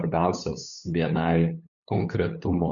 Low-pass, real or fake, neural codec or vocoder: 7.2 kHz; fake; codec, 16 kHz, 8 kbps, FunCodec, trained on LibriTTS, 25 frames a second